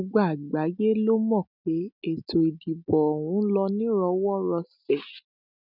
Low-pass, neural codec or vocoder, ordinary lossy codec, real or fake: 5.4 kHz; none; none; real